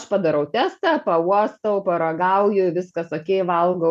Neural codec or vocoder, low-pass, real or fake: none; 14.4 kHz; real